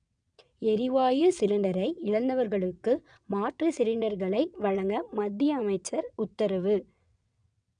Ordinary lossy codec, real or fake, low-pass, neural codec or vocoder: none; fake; 9.9 kHz; vocoder, 22.05 kHz, 80 mel bands, WaveNeXt